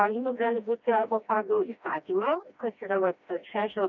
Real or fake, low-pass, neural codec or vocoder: fake; 7.2 kHz; codec, 16 kHz, 1 kbps, FreqCodec, smaller model